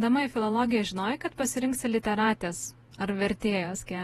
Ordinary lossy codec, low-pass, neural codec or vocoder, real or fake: AAC, 32 kbps; 19.8 kHz; vocoder, 48 kHz, 128 mel bands, Vocos; fake